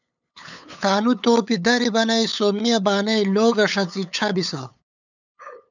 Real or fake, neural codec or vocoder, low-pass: fake; codec, 16 kHz, 8 kbps, FunCodec, trained on LibriTTS, 25 frames a second; 7.2 kHz